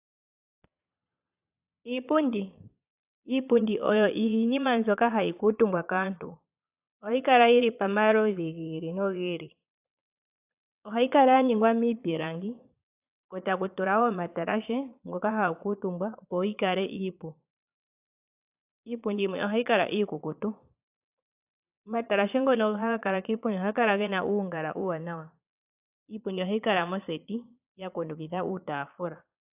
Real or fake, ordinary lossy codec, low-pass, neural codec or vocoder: fake; AAC, 32 kbps; 3.6 kHz; vocoder, 22.05 kHz, 80 mel bands, Vocos